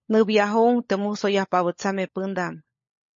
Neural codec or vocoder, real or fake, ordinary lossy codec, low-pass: codec, 16 kHz, 16 kbps, FunCodec, trained on LibriTTS, 50 frames a second; fake; MP3, 32 kbps; 7.2 kHz